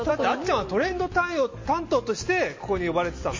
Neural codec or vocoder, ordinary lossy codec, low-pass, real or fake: none; MP3, 32 kbps; 7.2 kHz; real